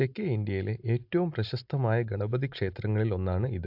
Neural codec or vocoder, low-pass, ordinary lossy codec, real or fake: none; 5.4 kHz; AAC, 48 kbps; real